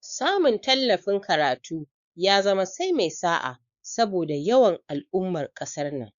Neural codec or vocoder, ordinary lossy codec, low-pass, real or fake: codec, 16 kHz, 4 kbps, X-Codec, WavLM features, trained on Multilingual LibriSpeech; Opus, 64 kbps; 7.2 kHz; fake